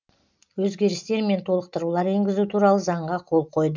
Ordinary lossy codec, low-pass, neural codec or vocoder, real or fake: none; 7.2 kHz; none; real